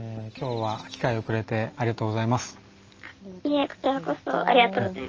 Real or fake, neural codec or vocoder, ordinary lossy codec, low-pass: real; none; Opus, 24 kbps; 7.2 kHz